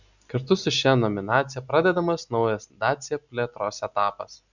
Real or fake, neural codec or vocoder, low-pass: real; none; 7.2 kHz